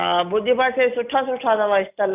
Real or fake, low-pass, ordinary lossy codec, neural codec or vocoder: real; 3.6 kHz; none; none